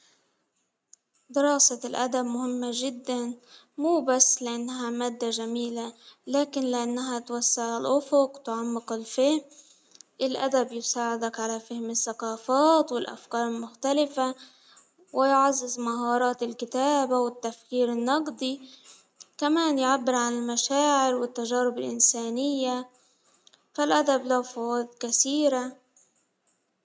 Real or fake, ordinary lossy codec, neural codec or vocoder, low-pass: real; none; none; none